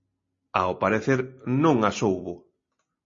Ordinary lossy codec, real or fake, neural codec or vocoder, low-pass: MP3, 32 kbps; real; none; 7.2 kHz